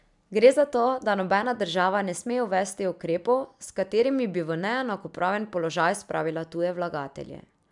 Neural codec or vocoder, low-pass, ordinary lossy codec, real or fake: none; 10.8 kHz; MP3, 96 kbps; real